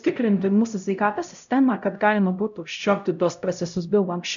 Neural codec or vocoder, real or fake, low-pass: codec, 16 kHz, 0.5 kbps, X-Codec, HuBERT features, trained on LibriSpeech; fake; 7.2 kHz